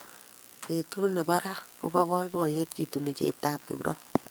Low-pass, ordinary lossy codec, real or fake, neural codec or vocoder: none; none; fake; codec, 44.1 kHz, 2.6 kbps, SNAC